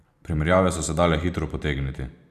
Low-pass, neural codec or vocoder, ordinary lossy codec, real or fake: 14.4 kHz; vocoder, 44.1 kHz, 128 mel bands every 512 samples, BigVGAN v2; none; fake